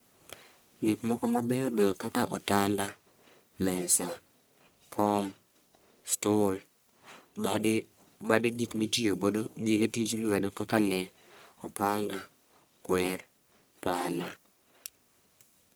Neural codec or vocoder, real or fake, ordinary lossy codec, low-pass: codec, 44.1 kHz, 1.7 kbps, Pupu-Codec; fake; none; none